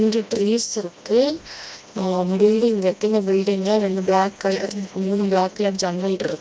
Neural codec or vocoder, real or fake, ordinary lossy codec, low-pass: codec, 16 kHz, 1 kbps, FreqCodec, smaller model; fake; none; none